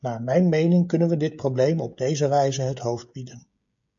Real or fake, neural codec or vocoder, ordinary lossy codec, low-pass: fake; codec, 16 kHz, 16 kbps, FreqCodec, smaller model; AAC, 64 kbps; 7.2 kHz